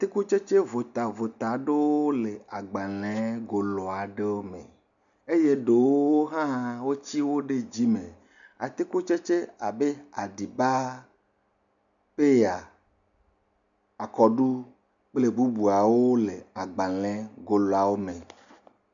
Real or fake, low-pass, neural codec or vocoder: real; 7.2 kHz; none